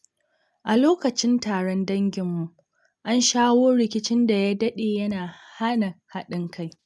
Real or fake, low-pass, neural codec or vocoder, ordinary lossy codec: real; none; none; none